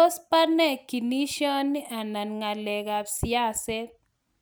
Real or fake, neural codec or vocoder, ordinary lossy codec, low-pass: real; none; none; none